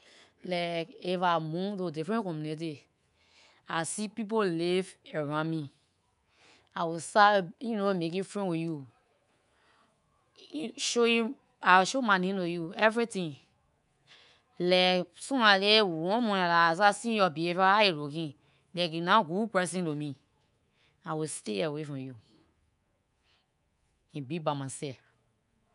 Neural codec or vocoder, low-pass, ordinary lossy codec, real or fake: codec, 24 kHz, 3.1 kbps, DualCodec; 10.8 kHz; none; fake